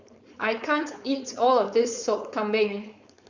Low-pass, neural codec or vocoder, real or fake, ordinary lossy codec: 7.2 kHz; codec, 16 kHz, 4.8 kbps, FACodec; fake; Opus, 64 kbps